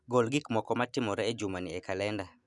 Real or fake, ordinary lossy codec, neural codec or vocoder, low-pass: real; none; none; 10.8 kHz